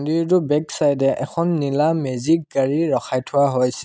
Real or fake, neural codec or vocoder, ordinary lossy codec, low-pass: real; none; none; none